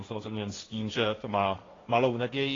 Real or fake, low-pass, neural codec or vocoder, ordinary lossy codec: fake; 7.2 kHz; codec, 16 kHz, 1.1 kbps, Voila-Tokenizer; AAC, 32 kbps